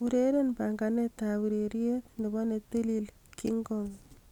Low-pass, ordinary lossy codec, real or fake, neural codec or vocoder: 19.8 kHz; none; real; none